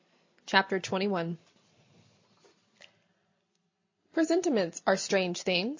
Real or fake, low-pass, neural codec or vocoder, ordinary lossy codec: real; 7.2 kHz; none; MP3, 48 kbps